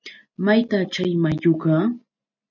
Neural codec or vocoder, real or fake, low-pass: none; real; 7.2 kHz